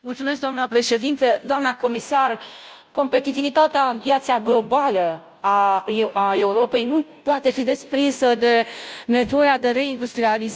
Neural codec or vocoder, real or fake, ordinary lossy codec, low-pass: codec, 16 kHz, 0.5 kbps, FunCodec, trained on Chinese and English, 25 frames a second; fake; none; none